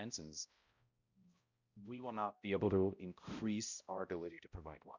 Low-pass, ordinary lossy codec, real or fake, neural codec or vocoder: 7.2 kHz; Opus, 64 kbps; fake; codec, 16 kHz, 0.5 kbps, X-Codec, HuBERT features, trained on balanced general audio